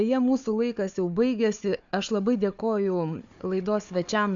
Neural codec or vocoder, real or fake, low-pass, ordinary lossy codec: codec, 16 kHz, 4 kbps, FunCodec, trained on Chinese and English, 50 frames a second; fake; 7.2 kHz; AAC, 64 kbps